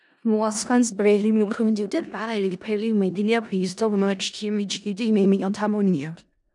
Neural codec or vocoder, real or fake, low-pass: codec, 16 kHz in and 24 kHz out, 0.4 kbps, LongCat-Audio-Codec, four codebook decoder; fake; 10.8 kHz